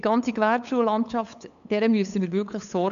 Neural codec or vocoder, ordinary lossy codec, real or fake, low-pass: codec, 16 kHz, 8 kbps, FunCodec, trained on LibriTTS, 25 frames a second; none; fake; 7.2 kHz